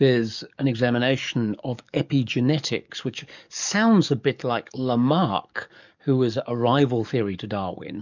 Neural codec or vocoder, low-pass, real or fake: codec, 44.1 kHz, 7.8 kbps, DAC; 7.2 kHz; fake